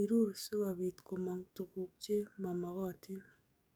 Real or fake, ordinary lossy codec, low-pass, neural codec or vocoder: fake; none; none; codec, 44.1 kHz, 7.8 kbps, DAC